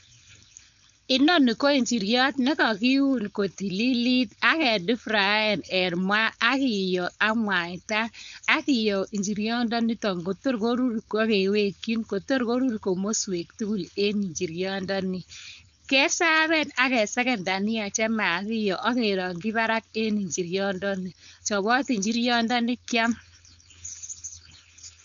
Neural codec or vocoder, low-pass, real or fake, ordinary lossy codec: codec, 16 kHz, 4.8 kbps, FACodec; 7.2 kHz; fake; none